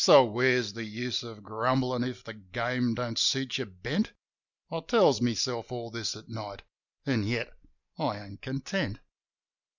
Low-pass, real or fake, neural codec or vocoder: 7.2 kHz; real; none